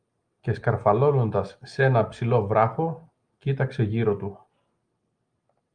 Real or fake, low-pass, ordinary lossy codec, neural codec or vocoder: real; 9.9 kHz; Opus, 32 kbps; none